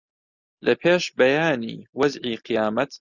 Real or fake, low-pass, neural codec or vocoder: real; 7.2 kHz; none